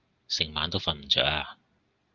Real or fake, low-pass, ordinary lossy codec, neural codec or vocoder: real; 7.2 kHz; Opus, 32 kbps; none